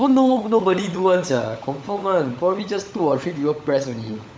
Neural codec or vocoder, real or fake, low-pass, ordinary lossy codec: codec, 16 kHz, 16 kbps, FunCodec, trained on LibriTTS, 50 frames a second; fake; none; none